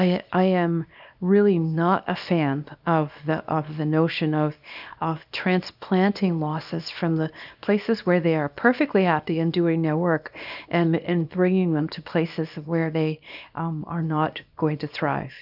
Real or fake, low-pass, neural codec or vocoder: fake; 5.4 kHz; codec, 24 kHz, 0.9 kbps, WavTokenizer, small release